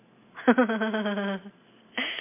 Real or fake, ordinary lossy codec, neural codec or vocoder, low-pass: real; MP3, 24 kbps; none; 3.6 kHz